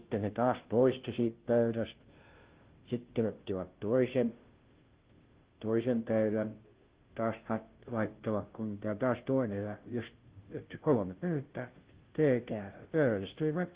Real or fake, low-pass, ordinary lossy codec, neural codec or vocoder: fake; 3.6 kHz; Opus, 16 kbps; codec, 16 kHz, 0.5 kbps, FunCodec, trained on Chinese and English, 25 frames a second